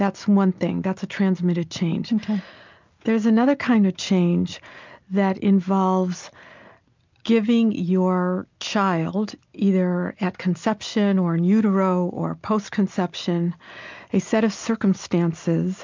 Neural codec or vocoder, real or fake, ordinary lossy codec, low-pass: none; real; MP3, 64 kbps; 7.2 kHz